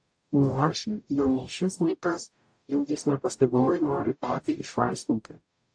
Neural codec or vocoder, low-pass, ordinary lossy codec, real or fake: codec, 44.1 kHz, 0.9 kbps, DAC; 9.9 kHz; AAC, 64 kbps; fake